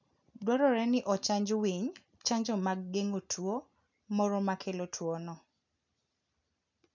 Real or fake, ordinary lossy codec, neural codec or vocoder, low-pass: real; none; none; 7.2 kHz